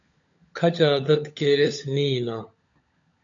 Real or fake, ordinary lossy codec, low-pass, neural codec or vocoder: fake; AAC, 48 kbps; 7.2 kHz; codec, 16 kHz, 16 kbps, FunCodec, trained on LibriTTS, 50 frames a second